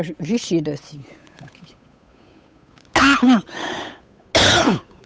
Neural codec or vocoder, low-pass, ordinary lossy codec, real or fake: codec, 16 kHz, 8 kbps, FunCodec, trained on Chinese and English, 25 frames a second; none; none; fake